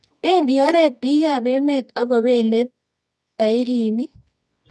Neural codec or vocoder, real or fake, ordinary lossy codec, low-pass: codec, 24 kHz, 0.9 kbps, WavTokenizer, medium music audio release; fake; none; none